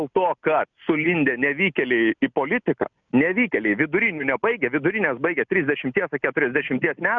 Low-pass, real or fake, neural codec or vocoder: 7.2 kHz; real; none